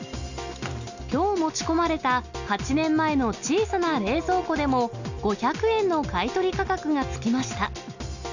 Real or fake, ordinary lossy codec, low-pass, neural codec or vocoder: real; none; 7.2 kHz; none